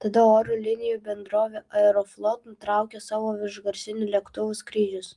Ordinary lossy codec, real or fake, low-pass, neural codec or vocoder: Opus, 24 kbps; real; 10.8 kHz; none